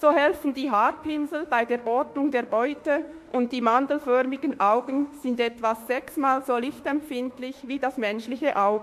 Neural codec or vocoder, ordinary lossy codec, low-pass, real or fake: autoencoder, 48 kHz, 32 numbers a frame, DAC-VAE, trained on Japanese speech; MP3, 64 kbps; 14.4 kHz; fake